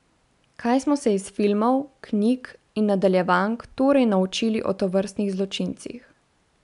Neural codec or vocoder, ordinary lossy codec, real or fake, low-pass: none; none; real; 10.8 kHz